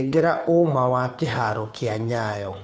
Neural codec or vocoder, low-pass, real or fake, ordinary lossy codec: codec, 16 kHz, 2 kbps, FunCodec, trained on Chinese and English, 25 frames a second; none; fake; none